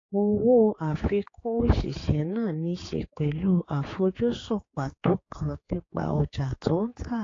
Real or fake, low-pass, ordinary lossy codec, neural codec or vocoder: fake; 7.2 kHz; AAC, 32 kbps; codec, 16 kHz, 4 kbps, X-Codec, HuBERT features, trained on general audio